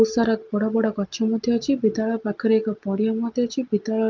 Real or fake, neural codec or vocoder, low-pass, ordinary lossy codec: real; none; 7.2 kHz; Opus, 24 kbps